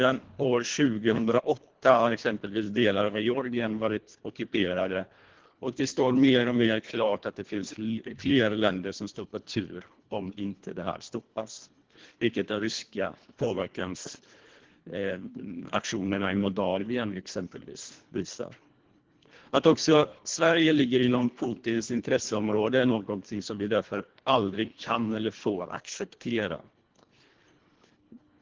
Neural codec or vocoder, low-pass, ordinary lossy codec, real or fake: codec, 24 kHz, 1.5 kbps, HILCodec; 7.2 kHz; Opus, 16 kbps; fake